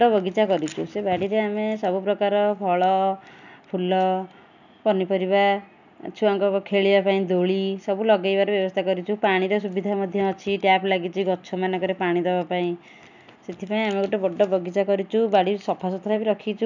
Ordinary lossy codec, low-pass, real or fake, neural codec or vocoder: none; 7.2 kHz; real; none